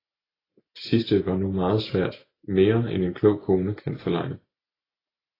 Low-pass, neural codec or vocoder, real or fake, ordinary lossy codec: 5.4 kHz; none; real; AAC, 24 kbps